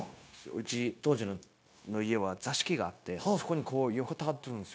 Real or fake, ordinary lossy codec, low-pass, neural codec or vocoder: fake; none; none; codec, 16 kHz, 0.9 kbps, LongCat-Audio-Codec